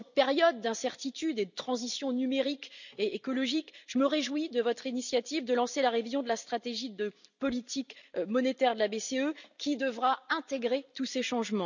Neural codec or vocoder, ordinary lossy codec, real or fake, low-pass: none; none; real; 7.2 kHz